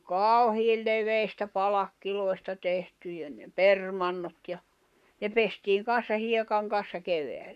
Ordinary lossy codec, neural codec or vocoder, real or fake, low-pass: none; codec, 44.1 kHz, 7.8 kbps, Pupu-Codec; fake; 14.4 kHz